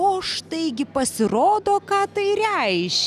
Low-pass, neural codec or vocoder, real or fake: 14.4 kHz; none; real